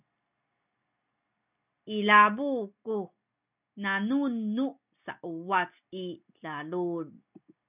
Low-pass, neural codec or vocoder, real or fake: 3.6 kHz; none; real